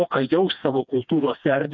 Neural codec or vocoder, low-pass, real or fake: codec, 16 kHz, 2 kbps, FreqCodec, smaller model; 7.2 kHz; fake